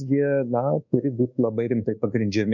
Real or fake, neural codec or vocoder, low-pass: fake; codec, 24 kHz, 1.2 kbps, DualCodec; 7.2 kHz